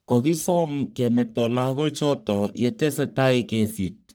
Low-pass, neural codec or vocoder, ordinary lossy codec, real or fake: none; codec, 44.1 kHz, 1.7 kbps, Pupu-Codec; none; fake